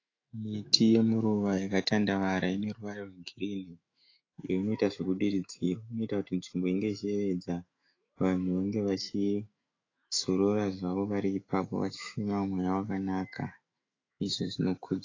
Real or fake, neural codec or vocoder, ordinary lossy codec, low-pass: fake; autoencoder, 48 kHz, 128 numbers a frame, DAC-VAE, trained on Japanese speech; AAC, 32 kbps; 7.2 kHz